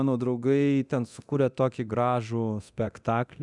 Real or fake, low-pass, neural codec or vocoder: fake; 10.8 kHz; codec, 24 kHz, 0.9 kbps, DualCodec